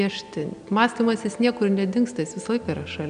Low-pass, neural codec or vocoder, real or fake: 9.9 kHz; none; real